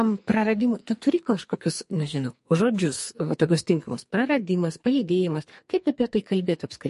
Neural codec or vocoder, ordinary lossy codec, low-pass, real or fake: codec, 44.1 kHz, 2.6 kbps, SNAC; MP3, 48 kbps; 14.4 kHz; fake